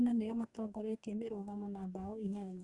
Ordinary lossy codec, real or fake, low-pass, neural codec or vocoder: Opus, 64 kbps; fake; 10.8 kHz; codec, 44.1 kHz, 2.6 kbps, DAC